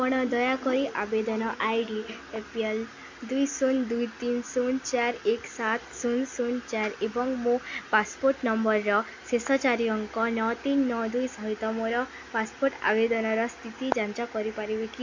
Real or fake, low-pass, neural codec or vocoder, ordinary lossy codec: real; 7.2 kHz; none; MP3, 48 kbps